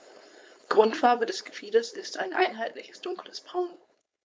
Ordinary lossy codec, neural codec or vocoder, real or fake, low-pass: none; codec, 16 kHz, 4.8 kbps, FACodec; fake; none